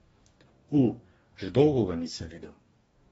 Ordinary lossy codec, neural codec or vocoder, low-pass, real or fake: AAC, 24 kbps; codec, 44.1 kHz, 2.6 kbps, DAC; 19.8 kHz; fake